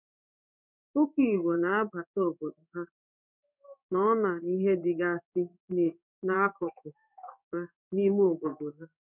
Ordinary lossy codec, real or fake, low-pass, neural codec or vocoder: none; fake; 3.6 kHz; codec, 16 kHz in and 24 kHz out, 1 kbps, XY-Tokenizer